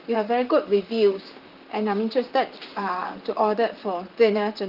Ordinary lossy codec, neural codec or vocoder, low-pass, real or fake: Opus, 32 kbps; vocoder, 44.1 kHz, 128 mel bands, Pupu-Vocoder; 5.4 kHz; fake